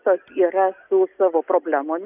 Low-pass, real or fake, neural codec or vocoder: 3.6 kHz; real; none